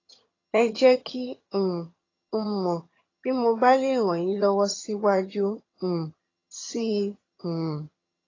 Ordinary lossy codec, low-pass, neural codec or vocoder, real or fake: AAC, 32 kbps; 7.2 kHz; vocoder, 22.05 kHz, 80 mel bands, HiFi-GAN; fake